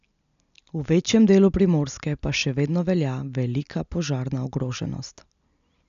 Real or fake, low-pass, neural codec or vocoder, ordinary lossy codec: real; 7.2 kHz; none; none